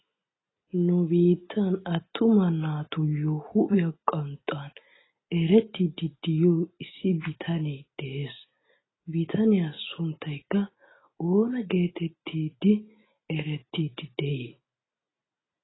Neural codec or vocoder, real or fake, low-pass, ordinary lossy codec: none; real; 7.2 kHz; AAC, 16 kbps